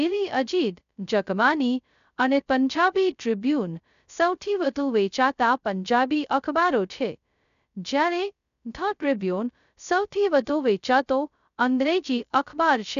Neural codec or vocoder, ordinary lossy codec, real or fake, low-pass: codec, 16 kHz, 0.2 kbps, FocalCodec; none; fake; 7.2 kHz